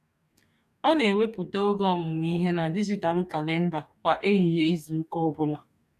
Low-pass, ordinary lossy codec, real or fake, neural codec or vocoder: 14.4 kHz; none; fake; codec, 44.1 kHz, 2.6 kbps, DAC